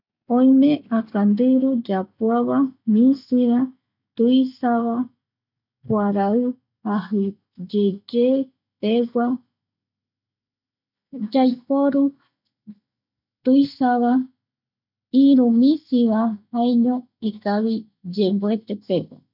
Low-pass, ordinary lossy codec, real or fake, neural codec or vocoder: 5.4 kHz; none; real; none